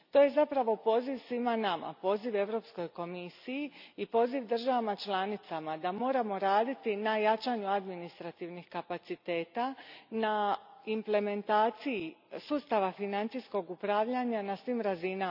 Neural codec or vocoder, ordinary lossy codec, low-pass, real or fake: none; none; 5.4 kHz; real